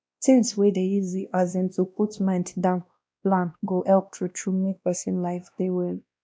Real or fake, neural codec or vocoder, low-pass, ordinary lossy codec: fake; codec, 16 kHz, 1 kbps, X-Codec, WavLM features, trained on Multilingual LibriSpeech; none; none